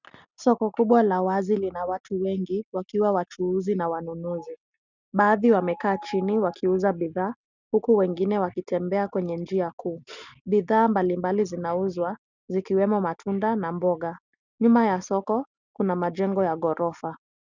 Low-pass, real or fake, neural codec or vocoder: 7.2 kHz; real; none